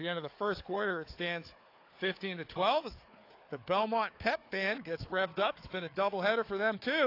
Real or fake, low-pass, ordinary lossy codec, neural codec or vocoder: fake; 5.4 kHz; AAC, 32 kbps; codec, 16 kHz, 4 kbps, FunCodec, trained on Chinese and English, 50 frames a second